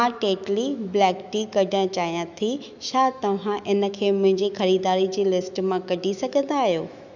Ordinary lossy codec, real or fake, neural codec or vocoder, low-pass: none; fake; autoencoder, 48 kHz, 128 numbers a frame, DAC-VAE, trained on Japanese speech; 7.2 kHz